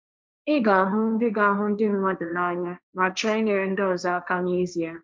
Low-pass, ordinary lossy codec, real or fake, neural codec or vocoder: none; none; fake; codec, 16 kHz, 1.1 kbps, Voila-Tokenizer